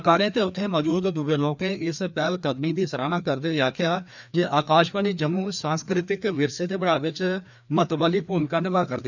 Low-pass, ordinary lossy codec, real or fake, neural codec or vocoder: 7.2 kHz; none; fake; codec, 16 kHz, 2 kbps, FreqCodec, larger model